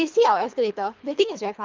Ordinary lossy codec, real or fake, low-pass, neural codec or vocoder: Opus, 24 kbps; fake; 7.2 kHz; codec, 24 kHz, 3 kbps, HILCodec